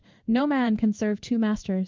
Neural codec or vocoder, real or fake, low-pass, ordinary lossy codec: codec, 16 kHz in and 24 kHz out, 1 kbps, XY-Tokenizer; fake; 7.2 kHz; Opus, 32 kbps